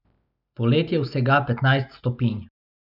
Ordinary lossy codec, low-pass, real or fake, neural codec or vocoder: none; 5.4 kHz; real; none